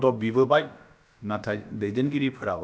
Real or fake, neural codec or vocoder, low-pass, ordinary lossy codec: fake; codec, 16 kHz, about 1 kbps, DyCAST, with the encoder's durations; none; none